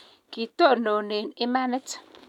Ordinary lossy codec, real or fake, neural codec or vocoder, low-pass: none; real; none; 19.8 kHz